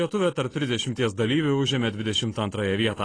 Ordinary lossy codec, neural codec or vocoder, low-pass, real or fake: AAC, 32 kbps; none; 9.9 kHz; real